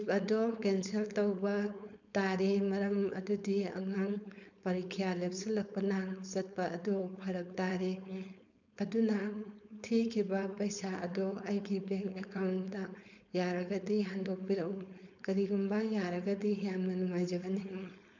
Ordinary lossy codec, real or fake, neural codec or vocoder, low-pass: none; fake; codec, 16 kHz, 4.8 kbps, FACodec; 7.2 kHz